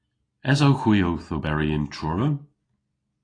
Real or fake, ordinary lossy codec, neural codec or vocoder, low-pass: real; AAC, 32 kbps; none; 9.9 kHz